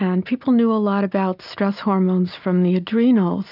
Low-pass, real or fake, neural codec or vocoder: 5.4 kHz; real; none